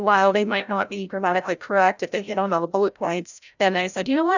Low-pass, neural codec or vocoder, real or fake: 7.2 kHz; codec, 16 kHz, 0.5 kbps, FreqCodec, larger model; fake